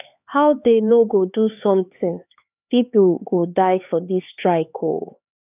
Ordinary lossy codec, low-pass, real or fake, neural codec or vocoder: none; 3.6 kHz; fake; codec, 16 kHz, 4 kbps, X-Codec, HuBERT features, trained on LibriSpeech